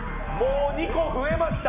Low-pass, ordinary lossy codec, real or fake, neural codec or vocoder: 3.6 kHz; none; real; none